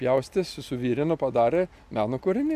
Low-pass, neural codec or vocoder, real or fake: 14.4 kHz; none; real